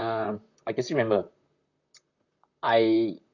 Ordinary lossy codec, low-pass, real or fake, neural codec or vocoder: none; 7.2 kHz; fake; vocoder, 44.1 kHz, 128 mel bands, Pupu-Vocoder